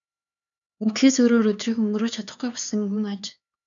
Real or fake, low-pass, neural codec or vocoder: fake; 7.2 kHz; codec, 16 kHz, 4 kbps, X-Codec, HuBERT features, trained on LibriSpeech